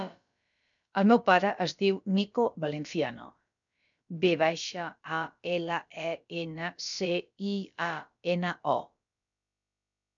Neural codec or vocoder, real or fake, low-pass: codec, 16 kHz, about 1 kbps, DyCAST, with the encoder's durations; fake; 7.2 kHz